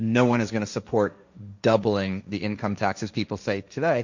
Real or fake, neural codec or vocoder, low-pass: fake; codec, 16 kHz, 1.1 kbps, Voila-Tokenizer; 7.2 kHz